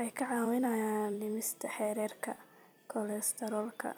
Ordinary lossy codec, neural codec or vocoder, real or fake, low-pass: none; vocoder, 44.1 kHz, 128 mel bands every 256 samples, BigVGAN v2; fake; none